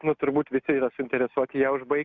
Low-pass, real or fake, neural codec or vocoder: 7.2 kHz; real; none